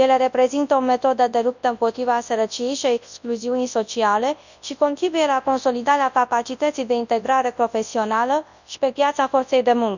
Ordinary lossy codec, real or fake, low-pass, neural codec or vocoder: none; fake; 7.2 kHz; codec, 24 kHz, 0.9 kbps, WavTokenizer, large speech release